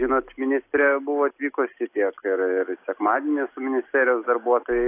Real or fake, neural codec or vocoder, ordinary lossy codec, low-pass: real; none; AAC, 24 kbps; 3.6 kHz